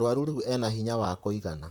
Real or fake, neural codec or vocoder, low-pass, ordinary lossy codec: fake; vocoder, 44.1 kHz, 128 mel bands, Pupu-Vocoder; none; none